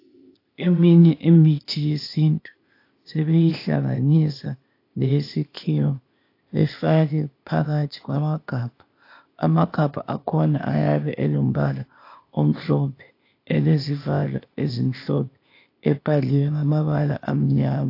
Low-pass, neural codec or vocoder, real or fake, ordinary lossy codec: 5.4 kHz; codec, 16 kHz, 0.8 kbps, ZipCodec; fake; AAC, 32 kbps